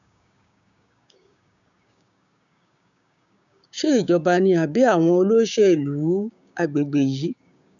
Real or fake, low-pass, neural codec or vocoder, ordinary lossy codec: fake; 7.2 kHz; codec, 16 kHz, 6 kbps, DAC; none